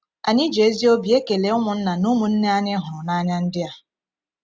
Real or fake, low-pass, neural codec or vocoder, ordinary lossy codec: real; none; none; none